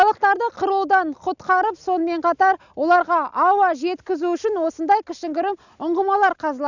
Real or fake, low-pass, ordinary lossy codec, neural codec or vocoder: real; 7.2 kHz; none; none